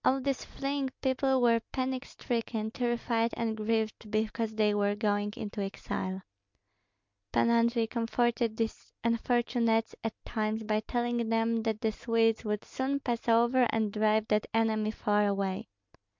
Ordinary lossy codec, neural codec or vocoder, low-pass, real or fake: MP3, 64 kbps; none; 7.2 kHz; real